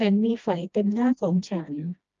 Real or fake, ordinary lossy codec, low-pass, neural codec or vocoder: fake; Opus, 24 kbps; 7.2 kHz; codec, 16 kHz, 1 kbps, FreqCodec, smaller model